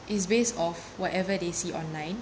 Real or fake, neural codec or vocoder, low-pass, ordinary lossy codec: real; none; none; none